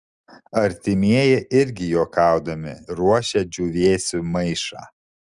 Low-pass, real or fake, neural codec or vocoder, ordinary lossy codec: 10.8 kHz; real; none; Opus, 32 kbps